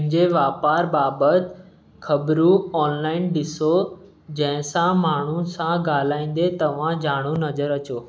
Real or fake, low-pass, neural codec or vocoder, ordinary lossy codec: real; none; none; none